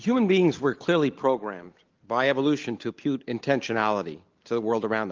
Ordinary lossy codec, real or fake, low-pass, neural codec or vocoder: Opus, 32 kbps; real; 7.2 kHz; none